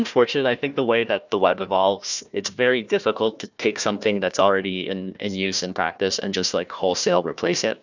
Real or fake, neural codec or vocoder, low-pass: fake; codec, 16 kHz, 1 kbps, FunCodec, trained on Chinese and English, 50 frames a second; 7.2 kHz